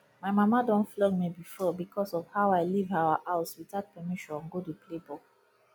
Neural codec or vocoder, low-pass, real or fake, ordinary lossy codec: none; none; real; none